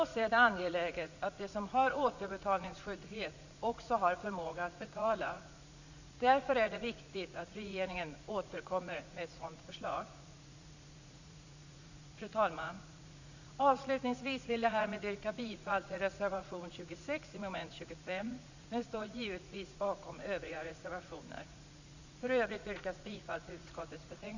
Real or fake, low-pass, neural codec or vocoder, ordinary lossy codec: fake; 7.2 kHz; vocoder, 44.1 kHz, 80 mel bands, Vocos; none